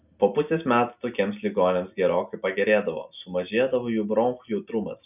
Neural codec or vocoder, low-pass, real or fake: none; 3.6 kHz; real